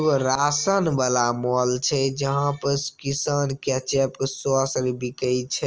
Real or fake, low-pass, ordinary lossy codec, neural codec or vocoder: real; 7.2 kHz; Opus, 24 kbps; none